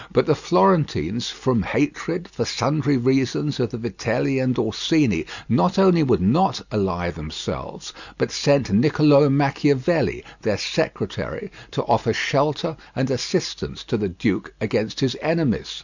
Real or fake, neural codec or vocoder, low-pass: real; none; 7.2 kHz